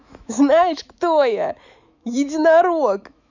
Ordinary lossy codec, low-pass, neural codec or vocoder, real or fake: none; 7.2 kHz; autoencoder, 48 kHz, 128 numbers a frame, DAC-VAE, trained on Japanese speech; fake